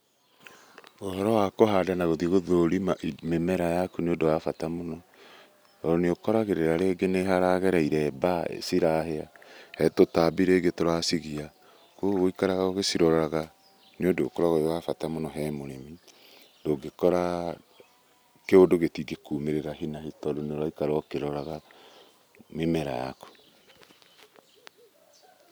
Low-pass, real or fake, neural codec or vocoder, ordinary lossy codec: none; real; none; none